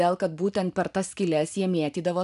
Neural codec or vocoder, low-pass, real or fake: none; 10.8 kHz; real